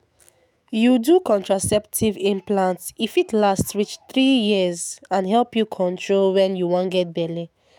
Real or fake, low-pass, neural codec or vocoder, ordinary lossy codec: fake; 19.8 kHz; autoencoder, 48 kHz, 128 numbers a frame, DAC-VAE, trained on Japanese speech; none